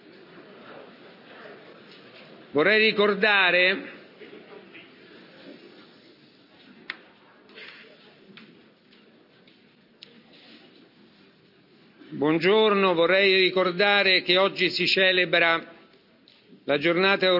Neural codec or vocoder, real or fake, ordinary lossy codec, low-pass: none; real; none; 5.4 kHz